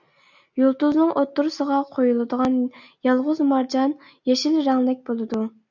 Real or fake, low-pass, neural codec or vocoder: real; 7.2 kHz; none